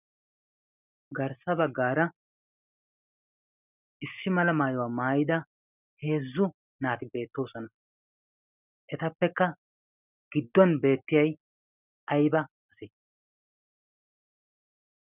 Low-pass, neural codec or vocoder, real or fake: 3.6 kHz; none; real